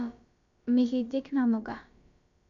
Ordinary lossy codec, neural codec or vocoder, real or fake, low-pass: none; codec, 16 kHz, about 1 kbps, DyCAST, with the encoder's durations; fake; 7.2 kHz